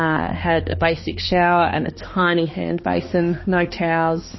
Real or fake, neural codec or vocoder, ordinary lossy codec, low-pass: fake; codec, 16 kHz, 2 kbps, X-Codec, HuBERT features, trained on balanced general audio; MP3, 24 kbps; 7.2 kHz